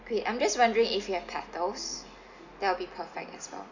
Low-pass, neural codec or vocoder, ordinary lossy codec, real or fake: 7.2 kHz; none; none; real